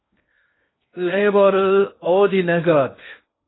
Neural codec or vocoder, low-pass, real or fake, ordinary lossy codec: codec, 16 kHz in and 24 kHz out, 0.6 kbps, FocalCodec, streaming, 2048 codes; 7.2 kHz; fake; AAC, 16 kbps